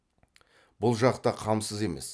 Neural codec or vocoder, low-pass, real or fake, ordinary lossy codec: none; none; real; none